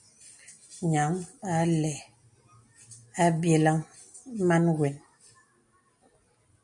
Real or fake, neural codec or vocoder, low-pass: real; none; 10.8 kHz